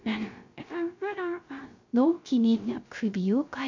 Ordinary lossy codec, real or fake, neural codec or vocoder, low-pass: MP3, 48 kbps; fake; codec, 16 kHz, 0.3 kbps, FocalCodec; 7.2 kHz